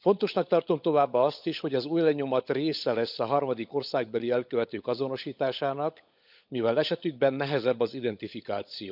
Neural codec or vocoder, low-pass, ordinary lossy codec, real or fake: codec, 16 kHz, 16 kbps, FunCodec, trained on LibriTTS, 50 frames a second; 5.4 kHz; none; fake